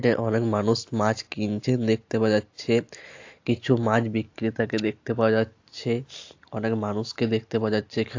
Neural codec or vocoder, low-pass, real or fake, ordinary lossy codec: none; 7.2 kHz; real; AAC, 48 kbps